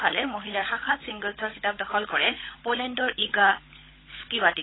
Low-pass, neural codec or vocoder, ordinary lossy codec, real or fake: 7.2 kHz; codec, 16 kHz, 16 kbps, FunCodec, trained on LibriTTS, 50 frames a second; AAC, 16 kbps; fake